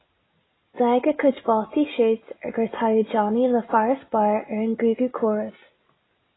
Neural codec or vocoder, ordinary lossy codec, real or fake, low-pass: none; AAC, 16 kbps; real; 7.2 kHz